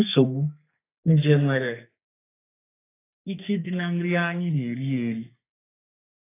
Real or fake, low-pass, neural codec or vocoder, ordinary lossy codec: fake; 3.6 kHz; codec, 32 kHz, 1.9 kbps, SNAC; AAC, 16 kbps